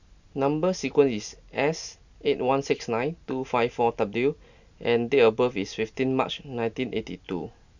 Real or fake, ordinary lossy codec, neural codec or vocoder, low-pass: real; none; none; 7.2 kHz